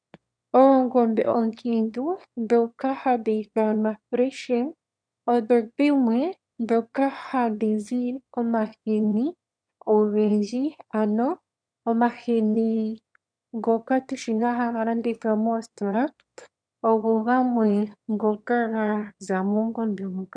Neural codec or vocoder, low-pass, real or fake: autoencoder, 22.05 kHz, a latent of 192 numbers a frame, VITS, trained on one speaker; 9.9 kHz; fake